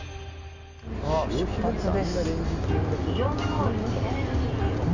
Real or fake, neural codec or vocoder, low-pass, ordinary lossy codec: real; none; 7.2 kHz; none